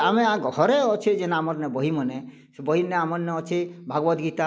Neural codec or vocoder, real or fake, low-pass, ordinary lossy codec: none; real; none; none